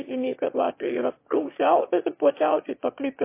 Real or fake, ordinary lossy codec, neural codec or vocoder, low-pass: fake; MP3, 24 kbps; autoencoder, 22.05 kHz, a latent of 192 numbers a frame, VITS, trained on one speaker; 3.6 kHz